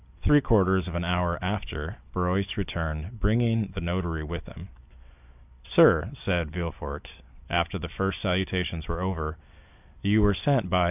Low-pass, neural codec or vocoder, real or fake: 3.6 kHz; none; real